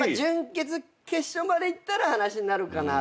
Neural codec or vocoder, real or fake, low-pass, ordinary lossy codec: none; real; none; none